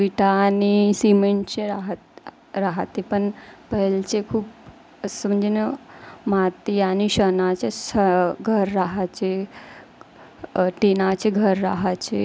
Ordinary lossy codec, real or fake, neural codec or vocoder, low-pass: none; real; none; none